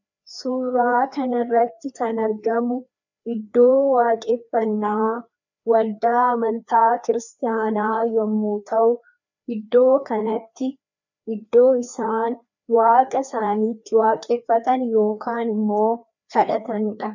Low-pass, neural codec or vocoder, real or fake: 7.2 kHz; codec, 16 kHz, 2 kbps, FreqCodec, larger model; fake